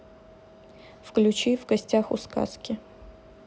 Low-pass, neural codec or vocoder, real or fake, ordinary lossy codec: none; none; real; none